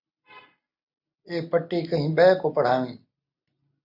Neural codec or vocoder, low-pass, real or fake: none; 5.4 kHz; real